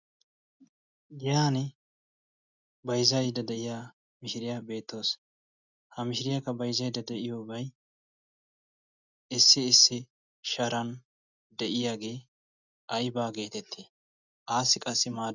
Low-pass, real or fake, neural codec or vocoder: 7.2 kHz; real; none